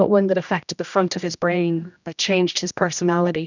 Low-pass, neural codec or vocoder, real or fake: 7.2 kHz; codec, 16 kHz, 1 kbps, X-Codec, HuBERT features, trained on general audio; fake